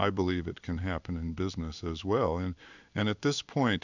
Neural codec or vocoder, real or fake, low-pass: codec, 16 kHz in and 24 kHz out, 1 kbps, XY-Tokenizer; fake; 7.2 kHz